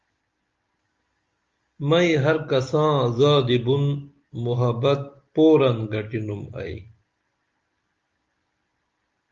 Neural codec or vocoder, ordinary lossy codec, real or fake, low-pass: none; Opus, 32 kbps; real; 7.2 kHz